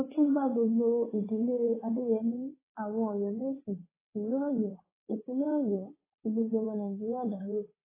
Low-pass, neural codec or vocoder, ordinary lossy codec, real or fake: 3.6 kHz; vocoder, 22.05 kHz, 80 mel bands, WaveNeXt; AAC, 16 kbps; fake